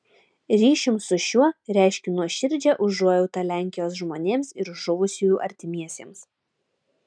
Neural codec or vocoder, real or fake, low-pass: none; real; 9.9 kHz